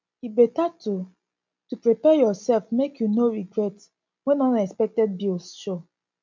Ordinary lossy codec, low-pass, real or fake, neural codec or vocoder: MP3, 64 kbps; 7.2 kHz; real; none